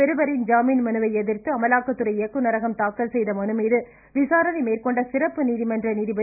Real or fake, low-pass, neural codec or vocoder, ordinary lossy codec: real; 3.6 kHz; none; none